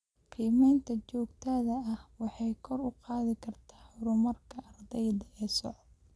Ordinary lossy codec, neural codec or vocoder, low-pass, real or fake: none; none; none; real